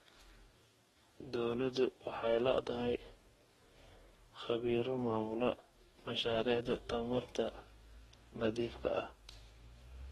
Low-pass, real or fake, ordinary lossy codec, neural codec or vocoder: 19.8 kHz; fake; AAC, 32 kbps; codec, 44.1 kHz, 2.6 kbps, DAC